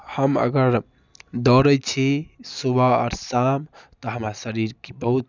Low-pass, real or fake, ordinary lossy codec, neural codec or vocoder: 7.2 kHz; real; none; none